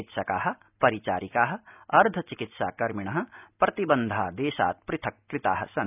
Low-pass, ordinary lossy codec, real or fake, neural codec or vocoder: 3.6 kHz; none; real; none